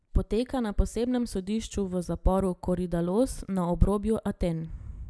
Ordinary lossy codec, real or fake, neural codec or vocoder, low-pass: none; real; none; none